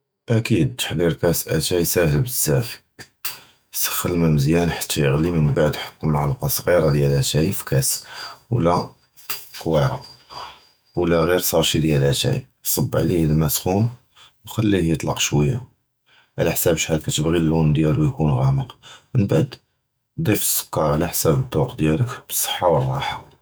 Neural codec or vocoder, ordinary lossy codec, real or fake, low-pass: autoencoder, 48 kHz, 128 numbers a frame, DAC-VAE, trained on Japanese speech; none; fake; none